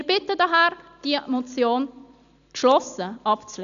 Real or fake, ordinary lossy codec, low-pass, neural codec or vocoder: real; none; 7.2 kHz; none